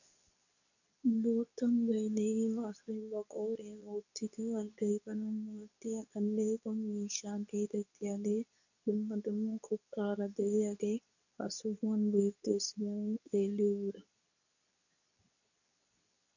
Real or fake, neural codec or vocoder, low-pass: fake; codec, 24 kHz, 0.9 kbps, WavTokenizer, medium speech release version 2; 7.2 kHz